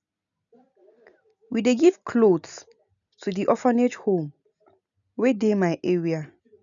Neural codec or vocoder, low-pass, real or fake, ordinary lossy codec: none; 7.2 kHz; real; none